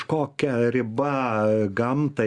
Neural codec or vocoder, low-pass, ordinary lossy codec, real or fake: none; 10.8 kHz; Opus, 64 kbps; real